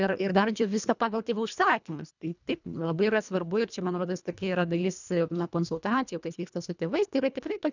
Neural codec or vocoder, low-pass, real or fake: codec, 24 kHz, 1.5 kbps, HILCodec; 7.2 kHz; fake